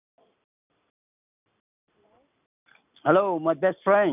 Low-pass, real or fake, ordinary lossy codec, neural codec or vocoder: 3.6 kHz; real; none; none